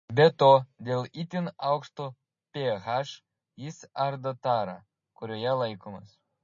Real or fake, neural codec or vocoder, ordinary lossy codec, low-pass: real; none; MP3, 32 kbps; 7.2 kHz